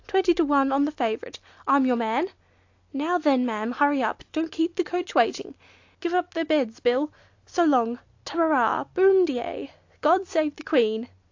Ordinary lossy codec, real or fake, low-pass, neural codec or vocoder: AAC, 48 kbps; real; 7.2 kHz; none